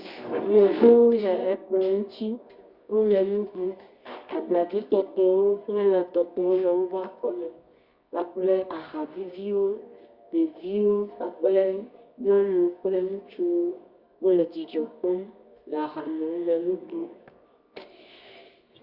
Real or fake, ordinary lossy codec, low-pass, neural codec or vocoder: fake; Opus, 64 kbps; 5.4 kHz; codec, 24 kHz, 0.9 kbps, WavTokenizer, medium music audio release